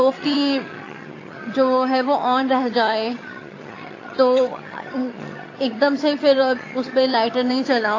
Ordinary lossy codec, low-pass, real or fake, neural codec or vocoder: AAC, 32 kbps; 7.2 kHz; fake; vocoder, 22.05 kHz, 80 mel bands, HiFi-GAN